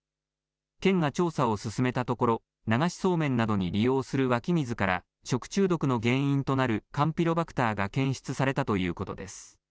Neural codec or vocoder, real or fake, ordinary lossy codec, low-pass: none; real; none; none